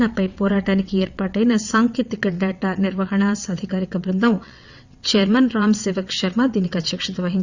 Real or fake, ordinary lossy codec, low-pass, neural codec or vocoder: fake; Opus, 64 kbps; 7.2 kHz; vocoder, 22.05 kHz, 80 mel bands, WaveNeXt